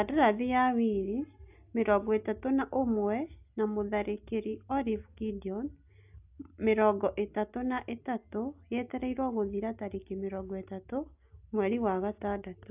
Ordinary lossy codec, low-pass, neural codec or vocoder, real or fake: AAC, 32 kbps; 3.6 kHz; none; real